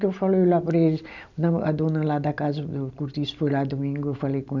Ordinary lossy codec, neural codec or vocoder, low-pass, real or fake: none; none; 7.2 kHz; real